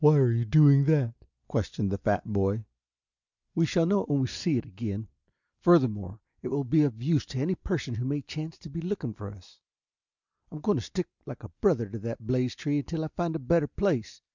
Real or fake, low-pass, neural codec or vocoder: real; 7.2 kHz; none